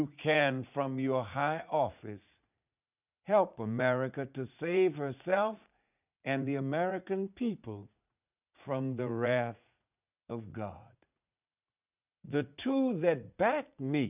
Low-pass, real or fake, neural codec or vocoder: 3.6 kHz; fake; vocoder, 44.1 kHz, 80 mel bands, Vocos